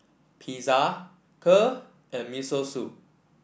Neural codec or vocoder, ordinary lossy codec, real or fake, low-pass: none; none; real; none